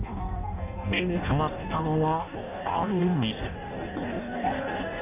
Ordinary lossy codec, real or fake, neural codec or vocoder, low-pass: none; fake; codec, 16 kHz in and 24 kHz out, 0.6 kbps, FireRedTTS-2 codec; 3.6 kHz